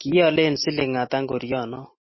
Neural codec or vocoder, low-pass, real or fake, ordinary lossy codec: none; 7.2 kHz; real; MP3, 24 kbps